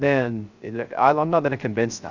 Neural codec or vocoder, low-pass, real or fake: codec, 16 kHz, 0.3 kbps, FocalCodec; 7.2 kHz; fake